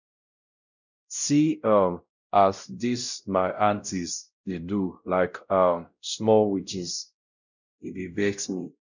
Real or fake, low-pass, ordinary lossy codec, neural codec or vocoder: fake; 7.2 kHz; none; codec, 16 kHz, 0.5 kbps, X-Codec, WavLM features, trained on Multilingual LibriSpeech